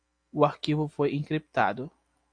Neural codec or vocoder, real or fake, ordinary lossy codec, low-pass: none; real; AAC, 48 kbps; 9.9 kHz